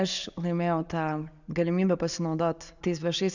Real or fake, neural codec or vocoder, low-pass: real; none; 7.2 kHz